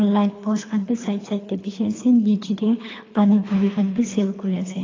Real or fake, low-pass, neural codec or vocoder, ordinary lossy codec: fake; 7.2 kHz; codec, 24 kHz, 3 kbps, HILCodec; AAC, 32 kbps